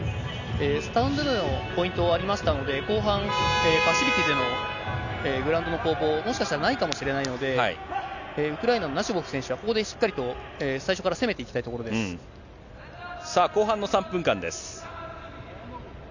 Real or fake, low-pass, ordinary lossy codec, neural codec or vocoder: real; 7.2 kHz; none; none